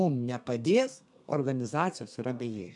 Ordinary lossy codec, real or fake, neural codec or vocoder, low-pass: MP3, 96 kbps; fake; codec, 32 kHz, 1.9 kbps, SNAC; 10.8 kHz